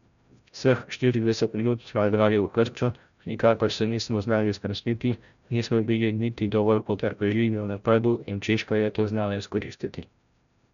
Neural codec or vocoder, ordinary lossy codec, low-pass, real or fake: codec, 16 kHz, 0.5 kbps, FreqCodec, larger model; none; 7.2 kHz; fake